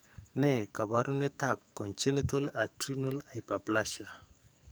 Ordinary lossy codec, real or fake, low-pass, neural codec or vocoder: none; fake; none; codec, 44.1 kHz, 2.6 kbps, SNAC